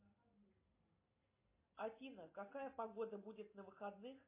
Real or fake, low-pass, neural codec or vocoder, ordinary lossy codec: real; 3.6 kHz; none; MP3, 24 kbps